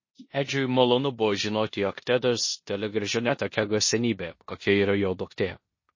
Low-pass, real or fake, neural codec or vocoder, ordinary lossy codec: 7.2 kHz; fake; codec, 16 kHz in and 24 kHz out, 0.9 kbps, LongCat-Audio-Codec, four codebook decoder; MP3, 32 kbps